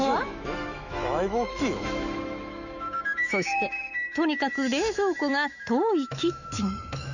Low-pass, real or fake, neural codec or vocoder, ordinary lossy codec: 7.2 kHz; fake; autoencoder, 48 kHz, 128 numbers a frame, DAC-VAE, trained on Japanese speech; none